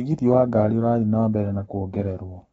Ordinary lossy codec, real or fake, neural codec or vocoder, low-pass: AAC, 24 kbps; fake; codec, 44.1 kHz, 7.8 kbps, Pupu-Codec; 19.8 kHz